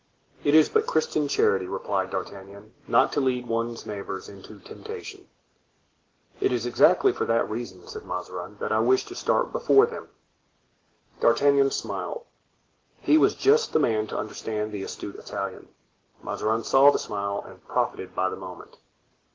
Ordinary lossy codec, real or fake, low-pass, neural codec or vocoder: Opus, 16 kbps; real; 7.2 kHz; none